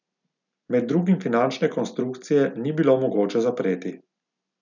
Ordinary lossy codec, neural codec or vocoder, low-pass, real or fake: none; none; 7.2 kHz; real